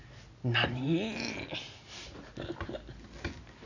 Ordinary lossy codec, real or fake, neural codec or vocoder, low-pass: none; real; none; 7.2 kHz